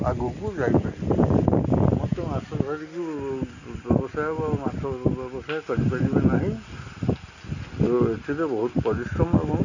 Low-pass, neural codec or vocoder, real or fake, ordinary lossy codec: 7.2 kHz; none; real; none